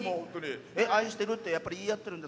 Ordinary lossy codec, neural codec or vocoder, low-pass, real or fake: none; none; none; real